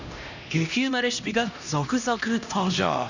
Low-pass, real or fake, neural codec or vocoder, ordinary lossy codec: 7.2 kHz; fake; codec, 16 kHz, 1 kbps, X-Codec, HuBERT features, trained on LibriSpeech; none